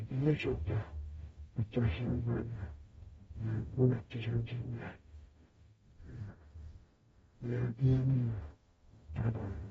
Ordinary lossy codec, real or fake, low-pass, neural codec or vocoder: AAC, 24 kbps; fake; 19.8 kHz; codec, 44.1 kHz, 0.9 kbps, DAC